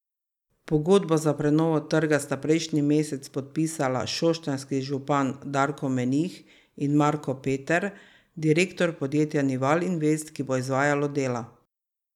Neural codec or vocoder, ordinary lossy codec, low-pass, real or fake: none; none; 19.8 kHz; real